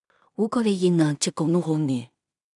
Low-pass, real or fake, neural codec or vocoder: 10.8 kHz; fake; codec, 16 kHz in and 24 kHz out, 0.4 kbps, LongCat-Audio-Codec, two codebook decoder